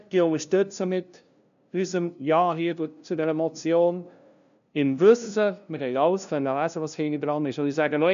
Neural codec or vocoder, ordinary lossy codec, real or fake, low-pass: codec, 16 kHz, 0.5 kbps, FunCodec, trained on LibriTTS, 25 frames a second; none; fake; 7.2 kHz